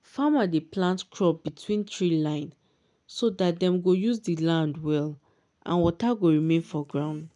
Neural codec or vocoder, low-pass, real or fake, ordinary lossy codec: none; 10.8 kHz; real; none